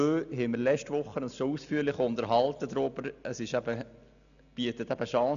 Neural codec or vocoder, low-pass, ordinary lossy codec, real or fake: none; 7.2 kHz; none; real